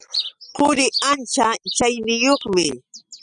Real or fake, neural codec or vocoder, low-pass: real; none; 9.9 kHz